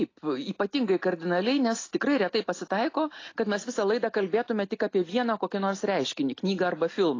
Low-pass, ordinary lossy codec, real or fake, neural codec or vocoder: 7.2 kHz; AAC, 32 kbps; real; none